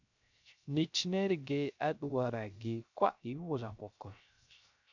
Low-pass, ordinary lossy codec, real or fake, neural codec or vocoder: 7.2 kHz; MP3, 64 kbps; fake; codec, 16 kHz, 0.3 kbps, FocalCodec